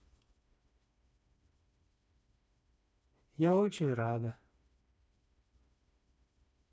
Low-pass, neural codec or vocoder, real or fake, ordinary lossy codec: none; codec, 16 kHz, 2 kbps, FreqCodec, smaller model; fake; none